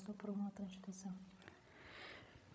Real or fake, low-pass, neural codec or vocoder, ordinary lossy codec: fake; none; codec, 16 kHz, 16 kbps, FreqCodec, larger model; none